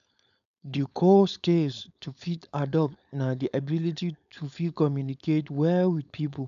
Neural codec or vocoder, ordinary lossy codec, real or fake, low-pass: codec, 16 kHz, 4.8 kbps, FACodec; AAC, 96 kbps; fake; 7.2 kHz